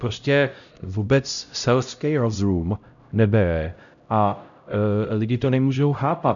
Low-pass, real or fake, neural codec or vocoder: 7.2 kHz; fake; codec, 16 kHz, 0.5 kbps, X-Codec, HuBERT features, trained on LibriSpeech